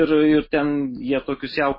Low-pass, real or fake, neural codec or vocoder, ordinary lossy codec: 5.4 kHz; real; none; MP3, 24 kbps